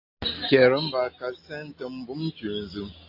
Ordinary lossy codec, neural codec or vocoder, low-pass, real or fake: AAC, 32 kbps; none; 5.4 kHz; real